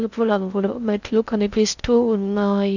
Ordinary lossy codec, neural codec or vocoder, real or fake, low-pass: none; codec, 16 kHz in and 24 kHz out, 0.6 kbps, FocalCodec, streaming, 2048 codes; fake; 7.2 kHz